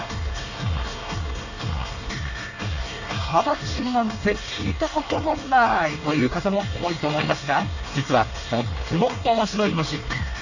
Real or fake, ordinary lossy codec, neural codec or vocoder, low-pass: fake; none; codec, 24 kHz, 1 kbps, SNAC; 7.2 kHz